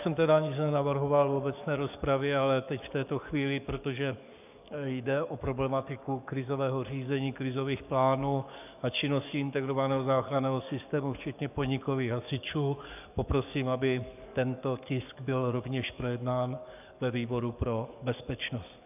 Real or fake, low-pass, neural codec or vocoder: fake; 3.6 kHz; codec, 44.1 kHz, 7.8 kbps, DAC